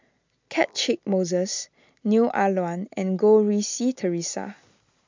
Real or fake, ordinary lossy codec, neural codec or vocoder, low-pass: real; MP3, 64 kbps; none; 7.2 kHz